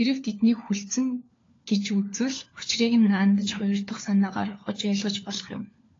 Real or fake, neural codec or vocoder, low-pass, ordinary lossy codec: fake; codec, 16 kHz, 4 kbps, FunCodec, trained on LibriTTS, 50 frames a second; 7.2 kHz; AAC, 32 kbps